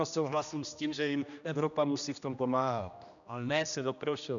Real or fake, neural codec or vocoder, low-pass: fake; codec, 16 kHz, 1 kbps, X-Codec, HuBERT features, trained on general audio; 7.2 kHz